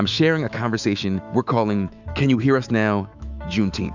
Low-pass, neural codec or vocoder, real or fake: 7.2 kHz; autoencoder, 48 kHz, 128 numbers a frame, DAC-VAE, trained on Japanese speech; fake